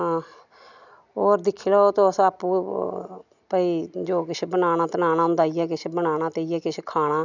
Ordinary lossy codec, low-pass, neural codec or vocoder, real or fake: none; 7.2 kHz; none; real